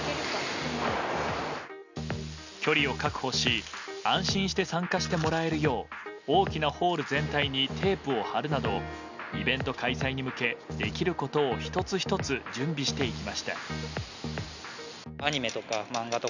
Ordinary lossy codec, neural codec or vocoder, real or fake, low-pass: none; none; real; 7.2 kHz